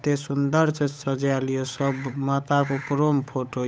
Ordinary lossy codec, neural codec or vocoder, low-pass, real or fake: none; codec, 16 kHz, 8 kbps, FunCodec, trained on Chinese and English, 25 frames a second; none; fake